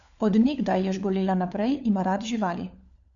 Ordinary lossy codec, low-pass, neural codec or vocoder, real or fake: none; 7.2 kHz; codec, 16 kHz, 4 kbps, FunCodec, trained on LibriTTS, 50 frames a second; fake